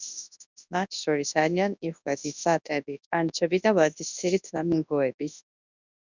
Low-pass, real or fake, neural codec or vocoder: 7.2 kHz; fake; codec, 24 kHz, 0.9 kbps, WavTokenizer, large speech release